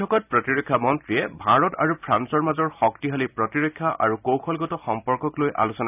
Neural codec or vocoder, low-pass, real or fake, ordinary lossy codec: none; 3.6 kHz; real; none